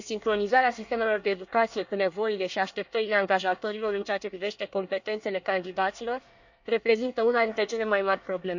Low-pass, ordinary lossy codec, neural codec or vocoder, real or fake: 7.2 kHz; none; codec, 24 kHz, 1 kbps, SNAC; fake